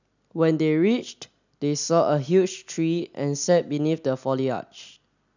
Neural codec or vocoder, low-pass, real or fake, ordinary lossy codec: none; 7.2 kHz; real; none